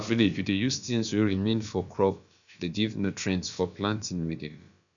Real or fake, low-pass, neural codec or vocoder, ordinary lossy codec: fake; 7.2 kHz; codec, 16 kHz, about 1 kbps, DyCAST, with the encoder's durations; none